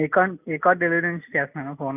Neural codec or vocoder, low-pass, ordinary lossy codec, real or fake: none; 3.6 kHz; none; real